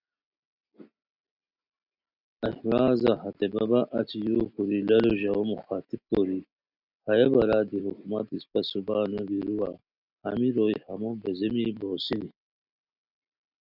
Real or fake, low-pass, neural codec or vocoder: real; 5.4 kHz; none